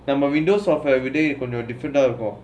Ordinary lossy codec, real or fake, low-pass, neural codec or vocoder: none; real; none; none